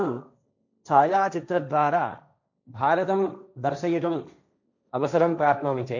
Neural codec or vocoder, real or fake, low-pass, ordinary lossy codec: codec, 16 kHz, 1.1 kbps, Voila-Tokenizer; fake; 7.2 kHz; none